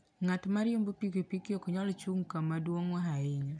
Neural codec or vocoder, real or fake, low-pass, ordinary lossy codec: none; real; 9.9 kHz; none